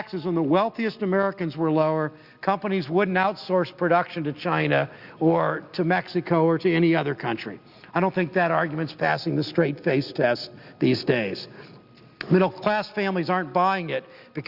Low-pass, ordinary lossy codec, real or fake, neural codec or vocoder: 5.4 kHz; Opus, 64 kbps; real; none